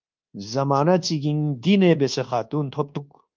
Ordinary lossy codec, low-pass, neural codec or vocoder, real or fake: Opus, 32 kbps; 7.2 kHz; codec, 24 kHz, 1.2 kbps, DualCodec; fake